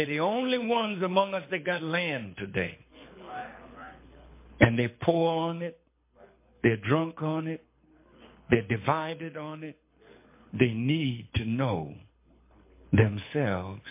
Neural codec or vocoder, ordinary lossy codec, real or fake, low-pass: codec, 24 kHz, 6 kbps, HILCodec; MP3, 24 kbps; fake; 3.6 kHz